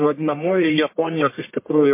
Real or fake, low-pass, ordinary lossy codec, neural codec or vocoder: fake; 3.6 kHz; MP3, 24 kbps; codec, 44.1 kHz, 1.7 kbps, Pupu-Codec